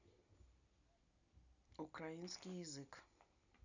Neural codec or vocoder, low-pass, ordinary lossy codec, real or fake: none; 7.2 kHz; none; real